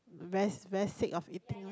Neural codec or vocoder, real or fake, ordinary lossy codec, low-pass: none; real; none; none